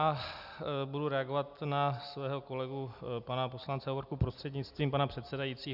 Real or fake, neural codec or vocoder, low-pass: real; none; 5.4 kHz